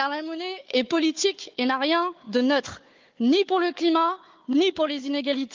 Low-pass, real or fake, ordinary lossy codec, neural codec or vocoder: 7.2 kHz; fake; Opus, 32 kbps; codec, 16 kHz, 4 kbps, FunCodec, trained on Chinese and English, 50 frames a second